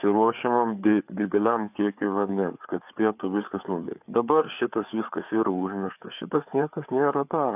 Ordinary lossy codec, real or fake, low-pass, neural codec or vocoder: AAC, 32 kbps; fake; 3.6 kHz; codec, 16 kHz, 4 kbps, FreqCodec, larger model